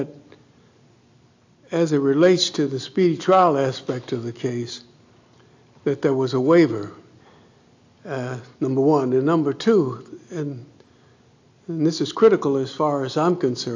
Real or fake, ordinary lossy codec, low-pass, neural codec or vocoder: real; AAC, 48 kbps; 7.2 kHz; none